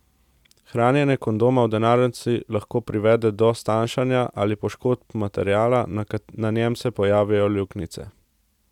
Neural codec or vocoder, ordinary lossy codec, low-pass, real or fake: none; none; 19.8 kHz; real